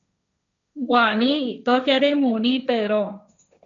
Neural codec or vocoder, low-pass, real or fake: codec, 16 kHz, 1.1 kbps, Voila-Tokenizer; 7.2 kHz; fake